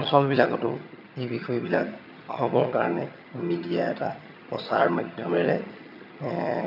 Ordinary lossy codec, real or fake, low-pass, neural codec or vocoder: none; fake; 5.4 kHz; vocoder, 22.05 kHz, 80 mel bands, HiFi-GAN